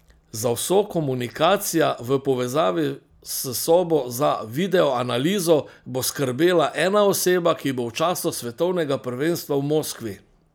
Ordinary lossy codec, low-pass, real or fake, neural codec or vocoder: none; none; real; none